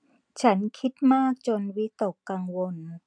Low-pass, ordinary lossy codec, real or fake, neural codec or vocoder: 9.9 kHz; none; real; none